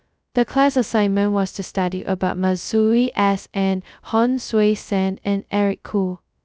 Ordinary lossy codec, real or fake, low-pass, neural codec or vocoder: none; fake; none; codec, 16 kHz, 0.2 kbps, FocalCodec